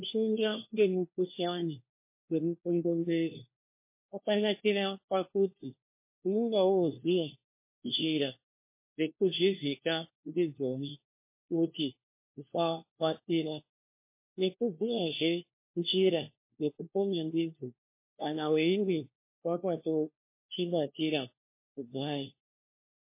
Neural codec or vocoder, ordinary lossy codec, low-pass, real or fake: codec, 16 kHz, 1 kbps, FunCodec, trained on LibriTTS, 50 frames a second; MP3, 24 kbps; 3.6 kHz; fake